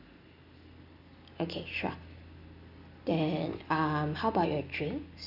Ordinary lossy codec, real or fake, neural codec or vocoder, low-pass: none; real; none; 5.4 kHz